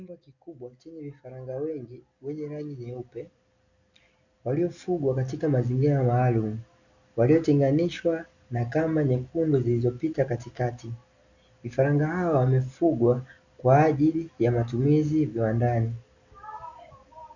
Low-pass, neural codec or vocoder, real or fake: 7.2 kHz; none; real